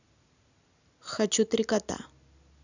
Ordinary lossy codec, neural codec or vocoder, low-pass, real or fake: none; none; 7.2 kHz; real